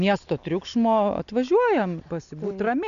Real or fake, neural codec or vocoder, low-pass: real; none; 7.2 kHz